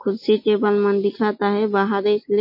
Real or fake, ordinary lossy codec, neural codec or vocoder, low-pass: real; MP3, 32 kbps; none; 5.4 kHz